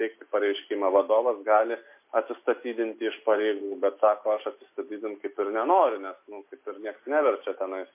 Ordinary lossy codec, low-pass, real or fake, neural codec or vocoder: MP3, 24 kbps; 3.6 kHz; real; none